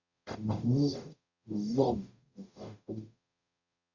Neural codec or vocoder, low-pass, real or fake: codec, 44.1 kHz, 0.9 kbps, DAC; 7.2 kHz; fake